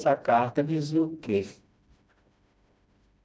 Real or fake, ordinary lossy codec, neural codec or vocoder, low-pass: fake; none; codec, 16 kHz, 1 kbps, FreqCodec, smaller model; none